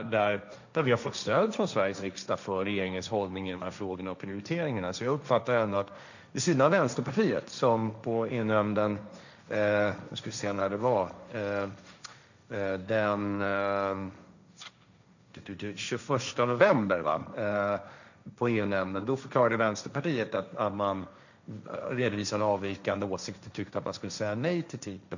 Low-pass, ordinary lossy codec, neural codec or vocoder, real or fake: 7.2 kHz; none; codec, 16 kHz, 1.1 kbps, Voila-Tokenizer; fake